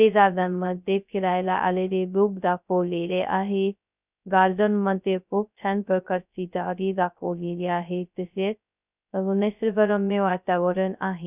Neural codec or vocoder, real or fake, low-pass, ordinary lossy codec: codec, 16 kHz, 0.2 kbps, FocalCodec; fake; 3.6 kHz; none